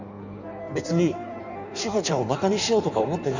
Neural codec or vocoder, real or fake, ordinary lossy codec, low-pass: codec, 16 kHz in and 24 kHz out, 1.1 kbps, FireRedTTS-2 codec; fake; none; 7.2 kHz